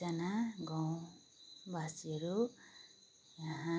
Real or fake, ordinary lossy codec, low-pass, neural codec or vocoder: real; none; none; none